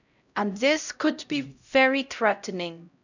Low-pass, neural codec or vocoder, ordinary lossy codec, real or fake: 7.2 kHz; codec, 16 kHz, 0.5 kbps, X-Codec, HuBERT features, trained on LibriSpeech; none; fake